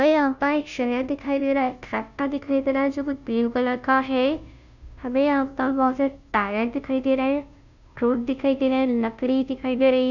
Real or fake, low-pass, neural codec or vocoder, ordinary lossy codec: fake; 7.2 kHz; codec, 16 kHz, 0.5 kbps, FunCodec, trained on Chinese and English, 25 frames a second; none